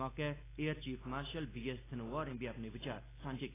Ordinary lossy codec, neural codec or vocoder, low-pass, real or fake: AAC, 16 kbps; none; 3.6 kHz; real